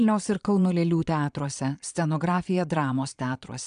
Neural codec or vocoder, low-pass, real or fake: vocoder, 22.05 kHz, 80 mel bands, Vocos; 9.9 kHz; fake